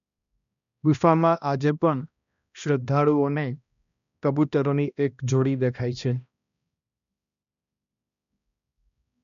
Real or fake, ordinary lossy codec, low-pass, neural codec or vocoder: fake; none; 7.2 kHz; codec, 16 kHz, 1 kbps, X-Codec, HuBERT features, trained on balanced general audio